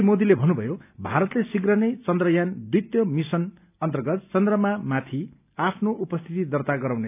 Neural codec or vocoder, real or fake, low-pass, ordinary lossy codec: none; real; 3.6 kHz; none